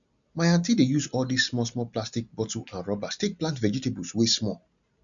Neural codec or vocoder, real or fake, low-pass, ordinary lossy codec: none; real; 7.2 kHz; none